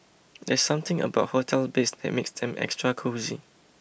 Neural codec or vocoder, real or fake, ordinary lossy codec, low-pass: none; real; none; none